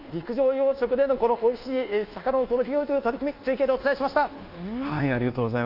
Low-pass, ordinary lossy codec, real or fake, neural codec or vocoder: 5.4 kHz; Opus, 24 kbps; fake; codec, 24 kHz, 1.2 kbps, DualCodec